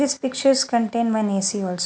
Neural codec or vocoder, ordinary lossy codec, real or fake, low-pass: none; none; real; none